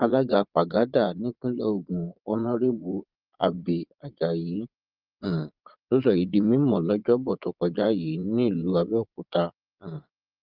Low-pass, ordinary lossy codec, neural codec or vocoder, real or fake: 5.4 kHz; Opus, 32 kbps; vocoder, 22.05 kHz, 80 mel bands, Vocos; fake